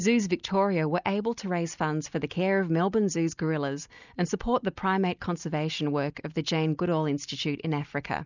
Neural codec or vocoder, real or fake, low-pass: none; real; 7.2 kHz